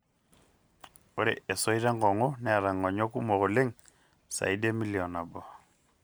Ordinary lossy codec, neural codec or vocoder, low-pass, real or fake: none; none; none; real